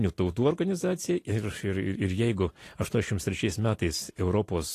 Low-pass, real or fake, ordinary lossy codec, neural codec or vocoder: 14.4 kHz; real; AAC, 48 kbps; none